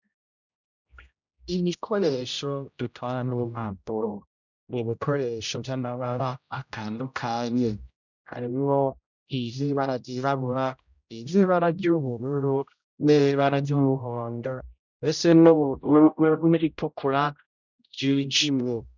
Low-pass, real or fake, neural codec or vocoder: 7.2 kHz; fake; codec, 16 kHz, 0.5 kbps, X-Codec, HuBERT features, trained on general audio